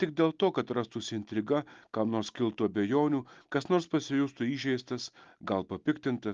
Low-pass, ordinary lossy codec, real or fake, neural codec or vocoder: 7.2 kHz; Opus, 32 kbps; real; none